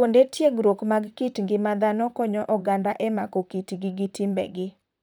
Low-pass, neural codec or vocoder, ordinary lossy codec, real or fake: none; vocoder, 44.1 kHz, 128 mel bands, Pupu-Vocoder; none; fake